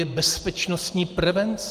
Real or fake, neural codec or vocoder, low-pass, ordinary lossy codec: real; none; 14.4 kHz; Opus, 24 kbps